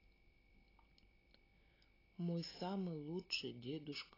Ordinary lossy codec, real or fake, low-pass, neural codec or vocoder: AAC, 24 kbps; real; 5.4 kHz; none